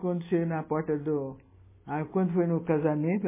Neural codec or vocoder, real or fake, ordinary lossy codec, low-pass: none; real; MP3, 16 kbps; 3.6 kHz